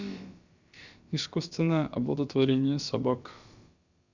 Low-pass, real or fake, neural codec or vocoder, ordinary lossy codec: 7.2 kHz; fake; codec, 16 kHz, about 1 kbps, DyCAST, with the encoder's durations; Opus, 64 kbps